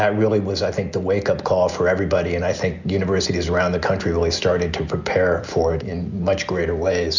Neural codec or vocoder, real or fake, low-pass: none; real; 7.2 kHz